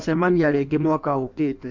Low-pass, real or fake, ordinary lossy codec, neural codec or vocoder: 7.2 kHz; fake; MP3, 64 kbps; codec, 16 kHz in and 24 kHz out, 1.1 kbps, FireRedTTS-2 codec